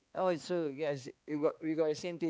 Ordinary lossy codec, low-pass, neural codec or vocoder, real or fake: none; none; codec, 16 kHz, 2 kbps, X-Codec, HuBERT features, trained on balanced general audio; fake